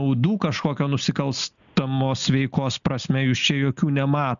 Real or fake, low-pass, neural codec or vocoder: real; 7.2 kHz; none